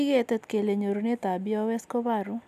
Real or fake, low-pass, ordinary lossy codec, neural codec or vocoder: real; 14.4 kHz; none; none